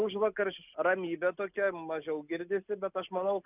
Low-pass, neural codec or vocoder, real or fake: 3.6 kHz; none; real